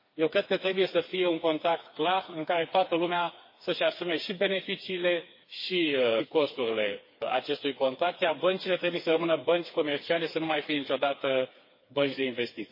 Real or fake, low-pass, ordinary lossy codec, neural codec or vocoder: fake; 5.4 kHz; MP3, 24 kbps; codec, 16 kHz, 4 kbps, FreqCodec, smaller model